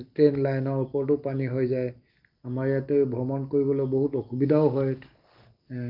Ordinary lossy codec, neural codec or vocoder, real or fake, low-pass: Opus, 24 kbps; none; real; 5.4 kHz